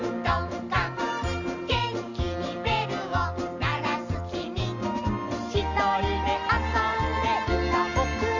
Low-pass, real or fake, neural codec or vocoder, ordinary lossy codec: 7.2 kHz; real; none; none